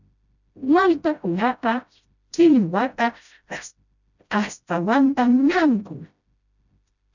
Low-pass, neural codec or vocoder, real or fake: 7.2 kHz; codec, 16 kHz, 0.5 kbps, FreqCodec, smaller model; fake